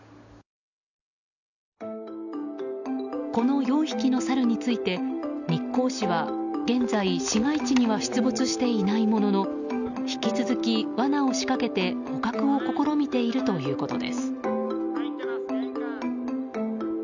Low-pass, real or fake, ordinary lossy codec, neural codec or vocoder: 7.2 kHz; real; none; none